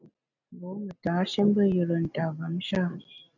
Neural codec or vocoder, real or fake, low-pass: none; real; 7.2 kHz